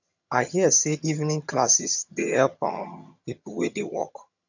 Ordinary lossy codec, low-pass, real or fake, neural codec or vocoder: none; 7.2 kHz; fake; vocoder, 22.05 kHz, 80 mel bands, HiFi-GAN